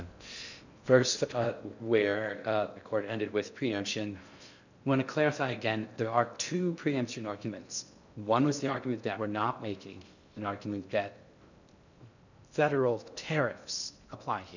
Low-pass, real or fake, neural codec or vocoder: 7.2 kHz; fake; codec, 16 kHz in and 24 kHz out, 0.6 kbps, FocalCodec, streaming, 4096 codes